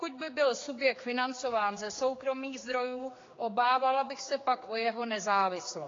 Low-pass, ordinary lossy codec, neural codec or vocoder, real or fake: 7.2 kHz; AAC, 32 kbps; codec, 16 kHz, 4 kbps, X-Codec, HuBERT features, trained on general audio; fake